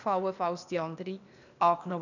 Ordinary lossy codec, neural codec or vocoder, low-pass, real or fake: none; codec, 16 kHz, 0.8 kbps, ZipCodec; 7.2 kHz; fake